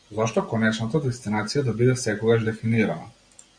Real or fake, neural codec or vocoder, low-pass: real; none; 9.9 kHz